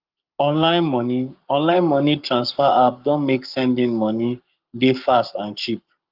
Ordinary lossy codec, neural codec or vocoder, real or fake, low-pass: Opus, 32 kbps; codec, 44.1 kHz, 7.8 kbps, Pupu-Codec; fake; 5.4 kHz